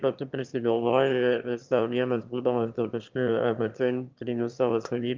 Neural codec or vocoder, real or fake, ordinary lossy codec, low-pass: autoencoder, 22.05 kHz, a latent of 192 numbers a frame, VITS, trained on one speaker; fake; Opus, 24 kbps; 7.2 kHz